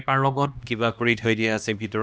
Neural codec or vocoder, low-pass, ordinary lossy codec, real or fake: codec, 16 kHz, 1 kbps, X-Codec, HuBERT features, trained on LibriSpeech; none; none; fake